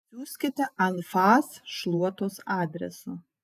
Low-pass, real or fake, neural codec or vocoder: 14.4 kHz; fake; vocoder, 48 kHz, 128 mel bands, Vocos